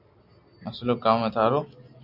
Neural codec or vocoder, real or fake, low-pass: none; real; 5.4 kHz